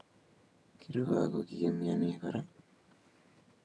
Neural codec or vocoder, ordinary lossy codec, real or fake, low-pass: vocoder, 22.05 kHz, 80 mel bands, HiFi-GAN; none; fake; none